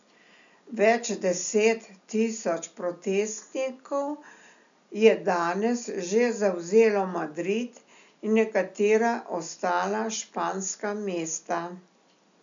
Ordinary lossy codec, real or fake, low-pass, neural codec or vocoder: none; real; 7.2 kHz; none